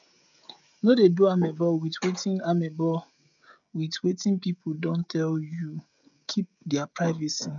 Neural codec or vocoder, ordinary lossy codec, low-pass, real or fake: codec, 16 kHz, 16 kbps, FreqCodec, smaller model; none; 7.2 kHz; fake